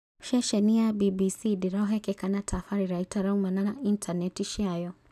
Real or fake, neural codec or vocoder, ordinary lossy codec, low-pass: real; none; none; 14.4 kHz